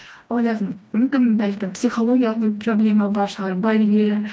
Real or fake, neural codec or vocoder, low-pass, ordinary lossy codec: fake; codec, 16 kHz, 1 kbps, FreqCodec, smaller model; none; none